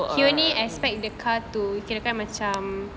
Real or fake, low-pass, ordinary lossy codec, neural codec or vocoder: real; none; none; none